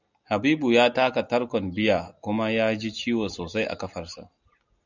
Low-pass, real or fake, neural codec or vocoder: 7.2 kHz; real; none